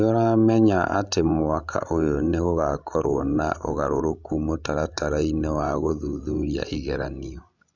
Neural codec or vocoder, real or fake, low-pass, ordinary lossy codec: codec, 16 kHz, 16 kbps, FreqCodec, larger model; fake; 7.2 kHz; none